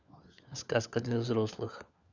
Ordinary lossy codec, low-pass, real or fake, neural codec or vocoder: none; 7.2 kHz; fake; codec, 16 kHz, 4 kbps, FunCodec, trained on LibriTTS, 50 frames a second